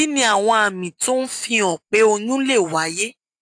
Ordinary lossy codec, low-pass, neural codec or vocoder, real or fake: AAC, 64 kbps; 9.9 kHz; none; real